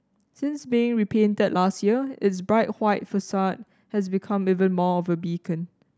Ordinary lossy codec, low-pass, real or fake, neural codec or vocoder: none; none; real; none